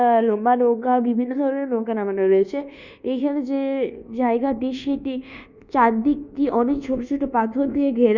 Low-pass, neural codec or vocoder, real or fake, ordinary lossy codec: 7.2 kHz; codec, 24 kHz, 1.2 kbps, DualCodec; fake; Opus, 64 kbps